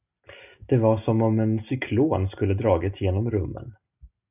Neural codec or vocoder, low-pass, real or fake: none; 3.6 kHz; real